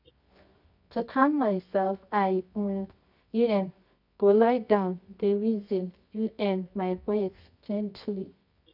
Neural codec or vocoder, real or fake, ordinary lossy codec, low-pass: codec, 24 kHz, 0.9 kbps, WavTokenizer, medium music audio release; fake; none; 5.4 kHz